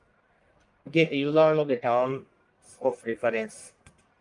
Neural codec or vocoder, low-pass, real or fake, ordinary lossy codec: codec, 44.1 kHz, 1.7 kbps, Pupu-Codec; 10.8 kHz; fake; Opus, 32 kbps